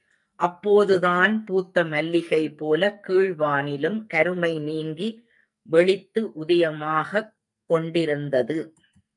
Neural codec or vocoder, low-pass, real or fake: codec, 44.1 kHz, 2.6 kbps, SNAC; 10.8 kHz; fake